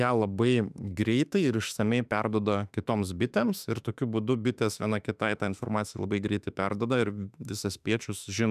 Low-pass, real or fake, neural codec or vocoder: 14.4 kHz; fake; autoencoder, 48 kHz, 32 numbers a frame, DAC-VAE, trained on Japanese speech